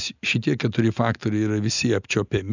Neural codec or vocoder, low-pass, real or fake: none; 7.2 kHz; real